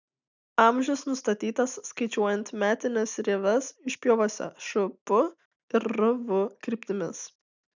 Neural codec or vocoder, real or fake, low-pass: none; real; 7.2 kHz